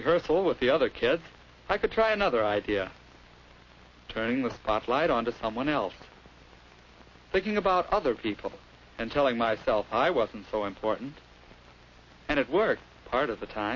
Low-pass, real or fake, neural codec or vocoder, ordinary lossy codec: 7.2 kHz; real; none; MP3, 32 kbps